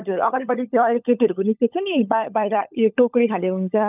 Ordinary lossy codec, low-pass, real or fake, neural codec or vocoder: none; 3.6 kHz; fake; codec, 16 kHz, 16 kbps, FunCodec, trained on LibriTTS, 50 frames a second